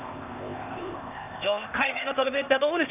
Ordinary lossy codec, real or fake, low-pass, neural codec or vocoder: none; fake; 3.6 kHz; codec, 16 kHz, 0.8 kbps, ZipCodec